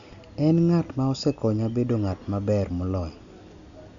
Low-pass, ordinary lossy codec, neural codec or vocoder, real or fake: 7.2 kHz; MP3, 96 kbps; none; real